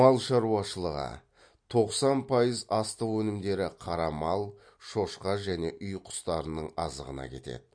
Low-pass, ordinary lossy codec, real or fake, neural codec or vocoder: 9.9 kHz; MP3, 48 kbps; real; none